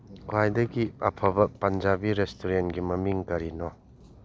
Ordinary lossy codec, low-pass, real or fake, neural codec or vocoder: none; none; real; none